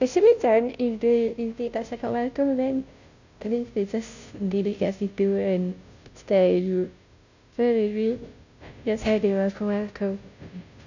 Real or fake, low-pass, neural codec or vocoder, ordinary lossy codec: fake; 7.2 kHz; codec, 16 kHz, 0.5 kbps, FunCodec, trained on Chinese and English, 25 frames a second; none